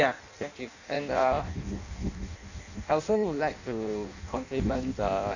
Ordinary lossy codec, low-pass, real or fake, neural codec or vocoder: none; 7.2 kHz; fake; codec, 16 kHz in and 24 kHz out, 0.6 kbps, FireRedTTS-2 codec